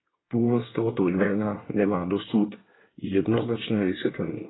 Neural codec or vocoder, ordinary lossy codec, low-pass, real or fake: codec, 24 kHz, 1 kbps, SNAC; AAC, 16 kbps; 7.2 kHz; fake